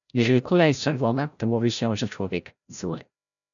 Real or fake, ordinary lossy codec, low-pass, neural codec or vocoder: fake; MP3, 96 kbps; 7.2 kHz; codec, 16 kHz, 0.5 kbps, FreqCodec, larger model